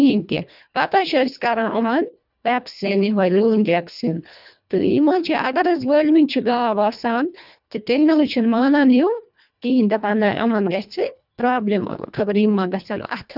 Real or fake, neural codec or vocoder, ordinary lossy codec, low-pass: fake; codec, 24 kHz, 1.5 kbps, HILCodec; none; 5.4 kHz